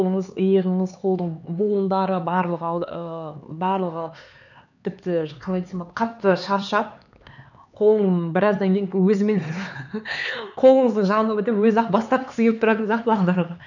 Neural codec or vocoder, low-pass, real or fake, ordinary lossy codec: codec, 16 kHz, 4 kbps, X-Codec, HuBERT features, trained on LibriSpeech; 7.2 kHz; fake; none